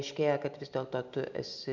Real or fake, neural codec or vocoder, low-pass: real; none; 7.2 kHz